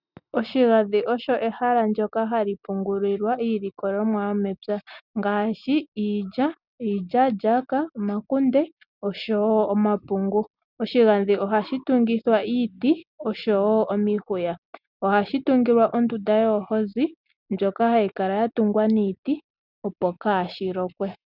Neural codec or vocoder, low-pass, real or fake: none; 5.4 kHz; real